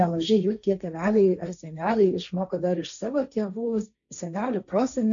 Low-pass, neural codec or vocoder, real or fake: 7.2 kHz; codec, 16 kHz, 1.1 kbps, Voila-Tokenizer; fake